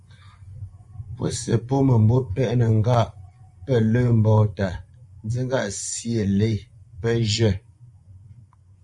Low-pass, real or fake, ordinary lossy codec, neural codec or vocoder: 10.8 kHz; fake; Opus, 64 kbps; vocoder, 24 kHz, 100 mel bands, Vocos